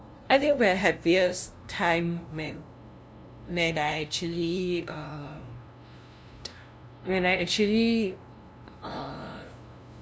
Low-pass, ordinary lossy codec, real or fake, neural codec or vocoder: none; none; fake; codec, 16 kHz, 0.5 kbps, FunCodec, trained on LibriTTS, 25 frames a second